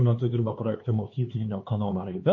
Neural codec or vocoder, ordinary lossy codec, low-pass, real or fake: codec, 16 kHz, 4 kbps, X-Codec, HuBERT features, trained on LibriSpeech; MP3, 32 kbps; 7.2 kHz; fake